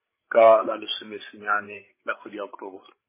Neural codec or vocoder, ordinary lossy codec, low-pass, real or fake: codec, 16 kHz, 8 kbps, FreqCodec, larger model; MP3, 16 kbps; 3.6 kHz; fake